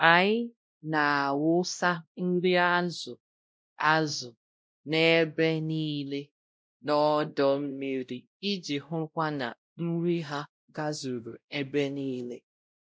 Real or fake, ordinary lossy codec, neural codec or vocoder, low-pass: fake; none; codec, 16 kHz, 0.5 kbps, X-Codec, WavLM features, trained on Multilingual LibriSpeech; none